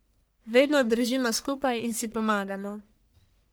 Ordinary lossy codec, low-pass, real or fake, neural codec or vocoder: none; none; fake; codec, 44.1 kHz, 1.7 kbps, Pupu-Codec